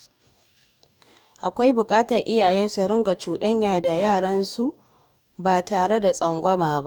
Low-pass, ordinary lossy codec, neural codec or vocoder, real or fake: 19.8 kHz; none; codec, 44.1 kHz, 2.6 kbps, DAC; fake